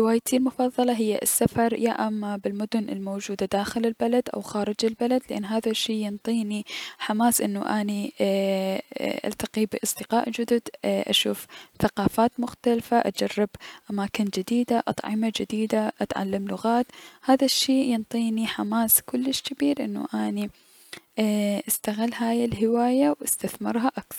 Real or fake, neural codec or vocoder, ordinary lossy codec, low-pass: real; none; none; 19.8 kHz